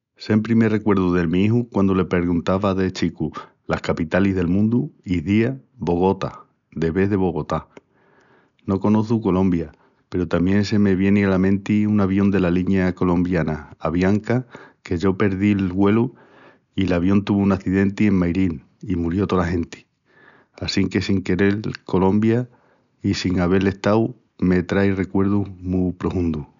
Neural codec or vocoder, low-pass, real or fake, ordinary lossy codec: none; 7.2 kHz; real; none